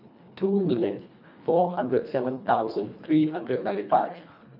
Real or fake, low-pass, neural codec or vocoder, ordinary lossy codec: fake; 5.4 kHz; codec, 24 kHz, 1.5 kbps, HILCodec; none